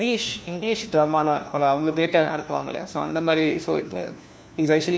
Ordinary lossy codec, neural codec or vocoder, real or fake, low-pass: none; codec, 16 kHz, 1 kbps, FunCodec, trained on LibriTTS, 50 frames a second; fake; none